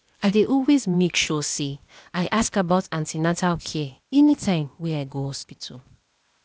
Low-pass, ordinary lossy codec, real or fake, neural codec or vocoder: none; none; fake; codec, 16 kHz, 0.8 kbps, ZipCodec